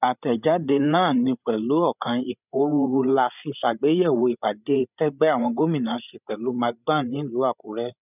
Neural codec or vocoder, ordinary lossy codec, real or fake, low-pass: codec, 16 kHz, 8 kbps, FreqCodec, larger model; none; fake; 3.6 kHz